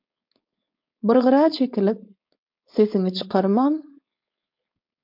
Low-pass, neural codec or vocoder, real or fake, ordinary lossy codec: 5.4 kHz; codec, 16 kHz, 4.8 kbps, FACodec; fake; MP3, 48 kbps